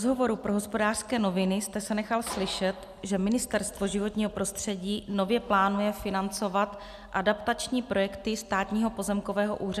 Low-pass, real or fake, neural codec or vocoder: 14.4 kHz; real; none